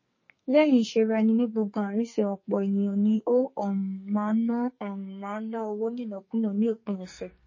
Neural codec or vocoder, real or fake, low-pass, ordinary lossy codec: codec, 44.1 kHz, 2.6 kbps, SNAC; fake; 7.2 kHz; MP3, 32 kbps